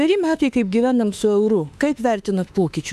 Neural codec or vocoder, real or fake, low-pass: autoencoder, 48 kHz, 32 numbers a frame, DAC-VAE, trained on Japanese speech; fake; 14.4 kHz